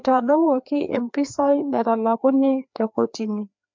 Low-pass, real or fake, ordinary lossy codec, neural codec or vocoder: 7.2 kHz; fake; MP3, 64 kbps; codec, 16 kHz, 2 kbps, FreqCodec, larger model